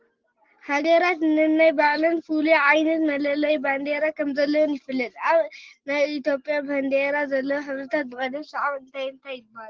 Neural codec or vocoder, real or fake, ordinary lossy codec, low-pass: none; real; Opus, 16 kbps; 7.2 kHz